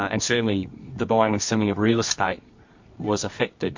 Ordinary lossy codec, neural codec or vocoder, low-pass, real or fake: MP3, 48 kbps; codec, 24 kHz, 0.9 kbps, WavTokenizer, medium music audio release; 7.2 kHz; fake